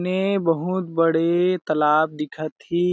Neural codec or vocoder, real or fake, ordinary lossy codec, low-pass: none; real; none; none